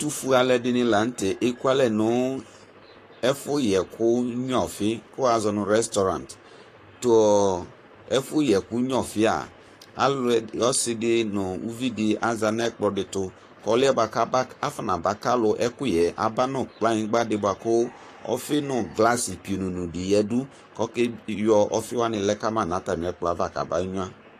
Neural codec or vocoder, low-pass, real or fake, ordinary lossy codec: codec, 44.1 kHz, 7.8 kbps, Pupu-Codec; 14.4 kHz; fake; AAC, 48 kbps